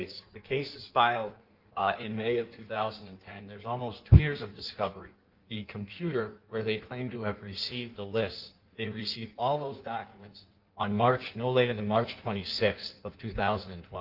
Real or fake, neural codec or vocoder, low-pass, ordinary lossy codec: fake; codec, 16 kHz in and 24 kHz out, 1.1 kbps, FireRedTTS-2 codec; 5.4 kHz; Opus, 24 kbps